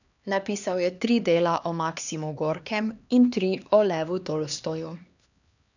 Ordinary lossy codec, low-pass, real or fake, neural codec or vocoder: none; 7.2 kHz; fake; codec, 16 kHz, 2 kbps, X-Codec, HuBERT features, trained on LibriSpeech